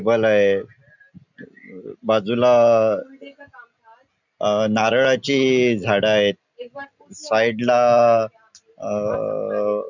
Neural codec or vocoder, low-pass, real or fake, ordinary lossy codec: none; 7.2 kHz; real; none